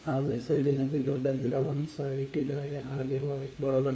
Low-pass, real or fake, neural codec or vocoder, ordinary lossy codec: none; fake; codec, 16 kHz, 2 kbps, FunCodec, trained on LibriTTS, 25 frames a second; none